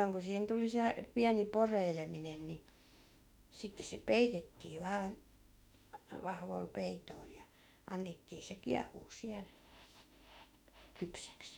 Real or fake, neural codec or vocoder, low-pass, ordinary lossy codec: fake; autoencoder, 48 kHz, 32 numbers a frame, DAC-VAE, trained on Japanese speech; 19.8 kHz; none